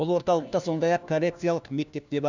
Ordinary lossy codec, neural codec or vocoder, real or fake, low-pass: none; codec, 16 kHz, 1 kbps, FunCodec, trained on LibriTTS, 50 frames a second; fake; 7.2 kHz